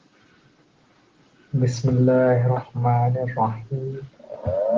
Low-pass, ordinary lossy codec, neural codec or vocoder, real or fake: 7.2 kHz; Opus, 16 kbps; none; real